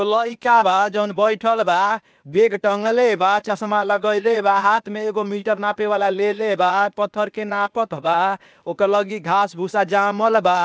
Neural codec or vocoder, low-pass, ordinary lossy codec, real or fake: codec, 16 kHz, 0.8 kbps, ZipCodec; none; none; fake